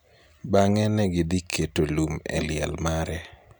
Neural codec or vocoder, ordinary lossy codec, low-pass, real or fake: none; none; none; real